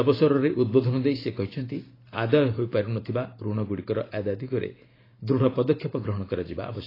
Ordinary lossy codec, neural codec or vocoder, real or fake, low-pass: AAC, 24 kbps; vocoder, 44.1 kHz, 128 mel bands every 512 samples, BigVGAN v2; fake; 5.4 kHz